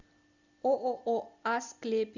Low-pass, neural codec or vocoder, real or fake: 7.2 kHz; none; real